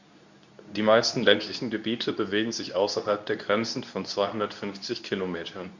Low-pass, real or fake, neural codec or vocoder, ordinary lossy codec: 7.2 kHz; fake; codec, 24 kHz, 0.9 kbps, WavTokenizer, medium speech release version 2; none